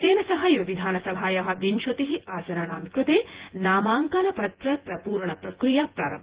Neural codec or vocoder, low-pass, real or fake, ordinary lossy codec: vocoder, 24 kHz, 100 mel bands, Vocos; 3.6 kHz; fake; Opus, 16 kbps